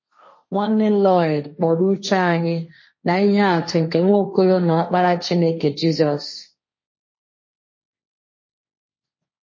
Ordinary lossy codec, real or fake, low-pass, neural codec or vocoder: MP3, 32 kbps; fake; 7.2 kHz; codec, 16 kHz, 1.1 kbps, Voila-Tokenizer